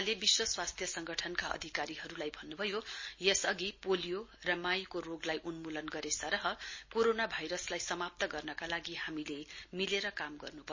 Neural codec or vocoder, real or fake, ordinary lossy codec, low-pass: none; real; MP3, 32 kbps; 7.2 kHz